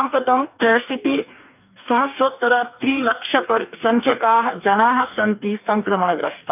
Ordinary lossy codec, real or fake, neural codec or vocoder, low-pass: none; fake; codec, 44.1 kHz, 2.6 kbps, DAC; 3.6 kHz